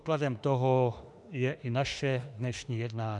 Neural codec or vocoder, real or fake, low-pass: autoencoder, 48 kHz, 32 numbers a frame, DAC-VAE, trained on Japanese speech; fake; 10.8 kHz